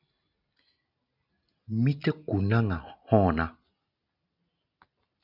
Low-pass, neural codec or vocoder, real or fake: 5.4 kHz; none; real